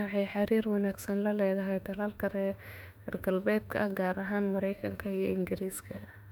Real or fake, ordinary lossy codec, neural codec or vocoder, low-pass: fake; none; autoencoder, 48 kHz, 32 numbers a frame, DAC-VAE, trained on Japanese speech; 19.8 kHz